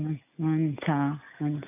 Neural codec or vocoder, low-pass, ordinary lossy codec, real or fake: none; 3.6 kHz; none; real